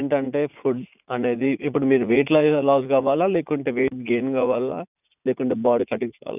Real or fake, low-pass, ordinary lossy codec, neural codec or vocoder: fake; 3.6 kHz; none; vocoder, 44.1 kHz, 80 mel bands, Vocos